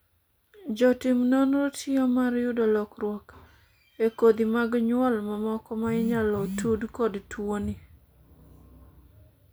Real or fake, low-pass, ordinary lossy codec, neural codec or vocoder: real; none; none; none